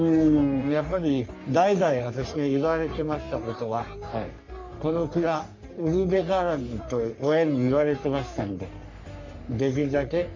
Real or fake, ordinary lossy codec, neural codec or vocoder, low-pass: fake; MP3, 48 kbps; codec, 44.1 kHz, 3.4 kbps, Pupu-Codec; 7.2 kHz